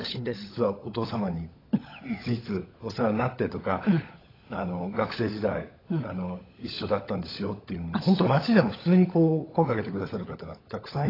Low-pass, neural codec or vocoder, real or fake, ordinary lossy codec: 5.4 kHz; codec, 16 kHz, 16 kbps, FunCodec, trained on LibriTTS, 50 frames a second; fake; AAC, 24 kbps